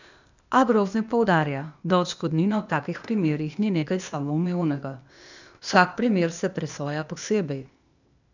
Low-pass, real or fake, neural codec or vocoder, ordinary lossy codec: 7.2 kHz; fake; codec, 16 kHz, 0.8 kbps, ZipCodec; none